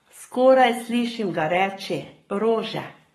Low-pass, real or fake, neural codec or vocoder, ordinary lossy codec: 19.8 kHz; fake; codec, 44.1 kHz, 7.8 kbps, Pupu-Codec; AAC, 32 kbps